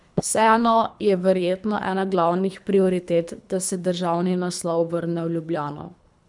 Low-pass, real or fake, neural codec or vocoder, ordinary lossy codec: 10.8 kHz; fake; codec, 24 kHz, 3 kbps, HILCodec; none